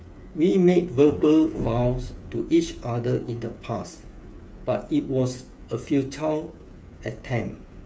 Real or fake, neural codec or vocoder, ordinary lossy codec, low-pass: fake; codec, 16 kHz, 8 kbps, FreqCodec, smaller model; none; none